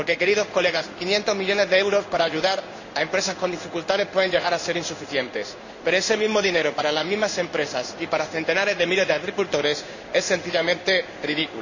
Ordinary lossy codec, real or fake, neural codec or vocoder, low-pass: none; fake; codec, 16 kHz in and 24 kHz out, 1 kbps, XY-Tokenizer; 7.2 kHz